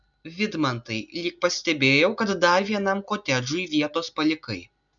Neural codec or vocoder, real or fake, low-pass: none; real; 7.2 kHz